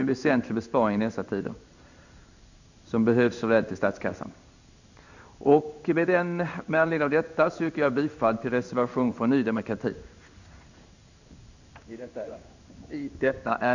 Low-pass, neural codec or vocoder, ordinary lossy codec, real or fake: 7.2 kHz; codec, 16 kHz in and 24 kHz out, 1 kbps, XY-Tokenizer; none; fake